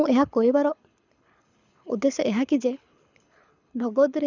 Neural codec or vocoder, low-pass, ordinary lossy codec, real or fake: codec, 24 kHz, 6 kbps, HILCodec; 7.2 kHz; none; fake